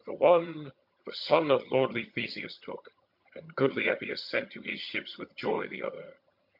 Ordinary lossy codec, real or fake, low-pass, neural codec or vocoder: MP3, 48 kbps; fake; 5.4 kHz; vocoder, 22.05 kHz, 80 mel bands, HiFi-GAN